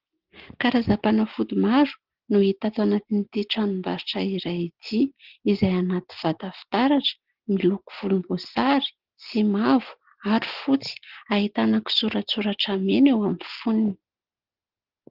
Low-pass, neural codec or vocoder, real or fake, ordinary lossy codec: 5.4 kHz; none; real; Opus, 16 kbps